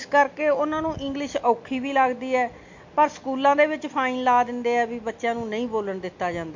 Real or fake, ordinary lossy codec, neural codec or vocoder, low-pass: real; MP3, 48 kbps; none; 7.2 kHz